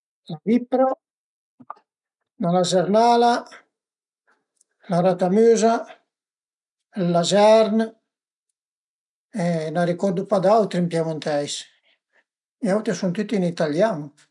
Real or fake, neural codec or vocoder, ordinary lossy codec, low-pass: real; none; none; 10.8 kHz